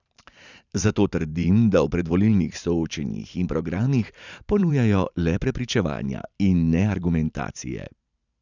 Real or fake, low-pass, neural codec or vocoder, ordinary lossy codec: fake; 7.2 kHz; vocoder, 44.1 kHz, 128 mel bands every 512 samples, BigVGAN v2; none